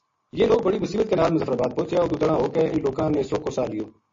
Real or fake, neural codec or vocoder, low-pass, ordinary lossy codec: real; none; 7.2 kHz; MP3, 32 kbps